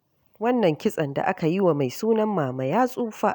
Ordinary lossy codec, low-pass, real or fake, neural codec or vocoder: none; none; real; none